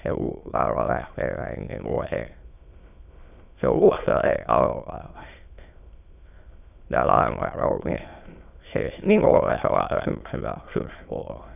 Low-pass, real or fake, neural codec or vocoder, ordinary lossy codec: 3.6 kHz; fake; autoencoder, 22.05 kHz, a latent of 192 numbers a frame, VITS, trained on many speakers; none